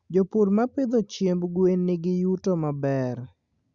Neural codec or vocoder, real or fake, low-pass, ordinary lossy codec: none; real; 7.2 kHz; Opus, 64 kbps